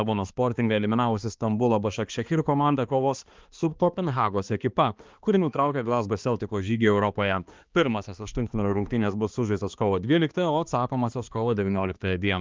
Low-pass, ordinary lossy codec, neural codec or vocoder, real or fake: 7.2 kHz; Opus, 32 kbps; codec, 16 kHz, 2 kbps, X-Codec, HuBERT features, trained on balanced general audio; fake